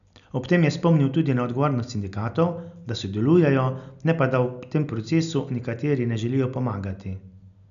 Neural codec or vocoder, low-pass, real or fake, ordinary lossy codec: none; 7.2 kHz; real; none